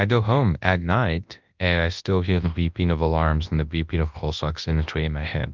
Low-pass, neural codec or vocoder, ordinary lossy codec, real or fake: 7.2 kHz; codec, 24 kHz, 0.9 kbps, WavTokenizer, large speech release; Opus, 24 kbps; fake